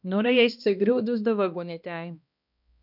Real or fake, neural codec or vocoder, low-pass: fake; codec, 16 kHz, 1 kbps, X-Codec, HuBERT features, trained on balanced general audio; 5.4 kHz